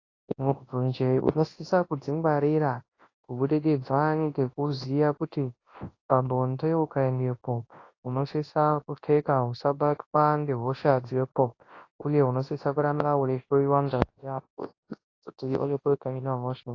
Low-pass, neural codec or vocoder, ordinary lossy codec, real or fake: 7.2 kHz; codec, 24 kHz, 0.9 kbps, WavTokenizer, large speech release; AAC, 32 kbps; fake